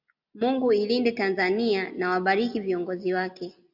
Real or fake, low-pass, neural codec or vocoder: real; 5.4 kHz; none